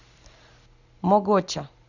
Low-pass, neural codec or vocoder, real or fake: 7.2 kHz; none; real